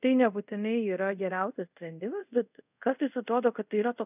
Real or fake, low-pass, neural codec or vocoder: fake; 3.6 kHz; codec, 24 kHz, 0.5 kbps, DualCodec